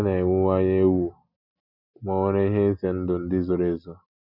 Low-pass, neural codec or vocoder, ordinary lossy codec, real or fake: 5.4 kHz; none; none; real